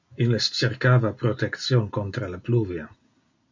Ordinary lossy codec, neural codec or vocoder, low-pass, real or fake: MP3, 64 kbps; none; 7.2 kHz; real